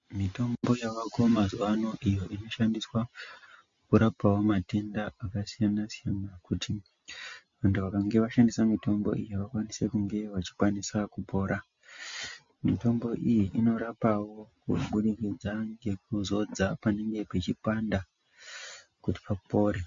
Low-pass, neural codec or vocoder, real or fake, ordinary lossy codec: 7.2 kHz; none; real; MP3, 48 kbps